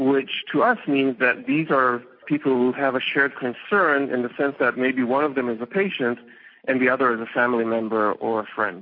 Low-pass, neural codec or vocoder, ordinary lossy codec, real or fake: 5.4 kHz; none; MP3, 32 kbps; real